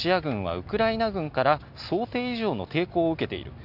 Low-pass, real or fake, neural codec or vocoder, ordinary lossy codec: 5.4 kHz; real; none; none